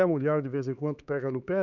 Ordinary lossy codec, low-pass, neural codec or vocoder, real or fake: none; 7.2 kHz; codec, 16 kHz, 4 kbps, X-Codec, HuBERT features, trained on LibriSpeech; fake